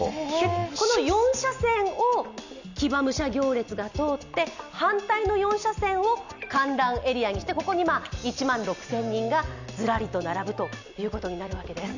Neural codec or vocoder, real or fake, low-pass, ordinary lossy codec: none; real; 7.2 kHz; none